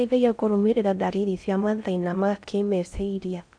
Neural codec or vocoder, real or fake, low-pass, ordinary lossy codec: codec, 16 kHz in and 24 kHz out, 0.6 kbps, FocalCodec, streaming, 4096 codes; fake; 9.9 kHz; none